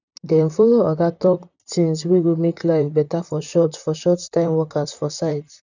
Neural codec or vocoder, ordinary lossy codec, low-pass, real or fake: vocoder, 44.1 kHz, 128 mel bands, Pupu-Vocoder; none; 7.2 kHz; fake